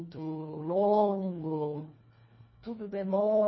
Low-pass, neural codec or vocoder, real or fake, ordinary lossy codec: 7.2 kHz; codec, 24 kHz, 1.5 kbps, HILCodec; fake; MP3, 24 kbps